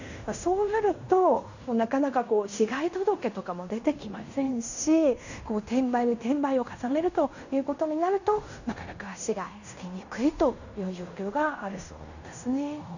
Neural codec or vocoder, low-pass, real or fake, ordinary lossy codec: codec, 16 kHz in and 24 kHz out, 0.9 kbps, LongCat-Audio-Codec, fine tuned four codebook decoder; 7.2 kHz; fake; none